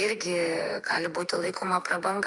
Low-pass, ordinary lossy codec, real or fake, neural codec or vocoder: 10.8 kHz; Opus, 64 kbps; fake; autoencoder, 48 kHz, 32 numbers a frame, DAC-VAE, trained on Japanese speech